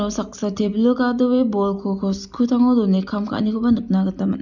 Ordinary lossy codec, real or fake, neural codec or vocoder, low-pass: none; real; none; 7.2 kHz